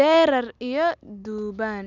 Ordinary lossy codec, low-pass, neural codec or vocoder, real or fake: none; 7.2 kHz; none; real